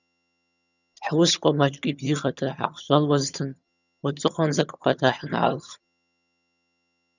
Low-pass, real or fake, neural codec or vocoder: 7.2 kHz; fake; vocoder, 22.05 kHz, 80 mel bands, HiFi-GAN